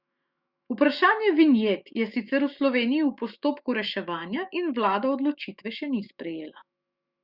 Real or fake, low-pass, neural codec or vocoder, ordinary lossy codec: fake; 5.4 kHz; autoencoder, 48 kHz, 128 numbers a frame, DAC-VAE, trained on Japanese speech; Opus, 64 kbps